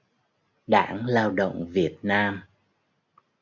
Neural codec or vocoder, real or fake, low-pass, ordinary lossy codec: none; real; 7.2 kHz; MP3, 64 kbps